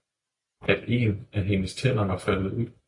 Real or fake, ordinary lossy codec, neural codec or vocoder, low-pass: real; AAC, 64 kbps; none; 10.8 kHz